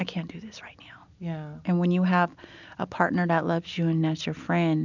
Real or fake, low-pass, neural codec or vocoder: real; 7.2 kHz; none